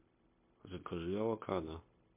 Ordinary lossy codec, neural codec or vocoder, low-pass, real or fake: MP3, 24 kbps; codec, 16 kHz, 0.9 kbps, LongCat-Audio-Codec; 3.6 kHz; fake